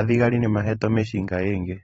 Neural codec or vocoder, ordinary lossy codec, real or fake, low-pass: vocoder, 44.1 kHz, 128 mel bands every 512 samples, BigVGAN v2; AAC, 24 kbps; fake; 19.8 kHz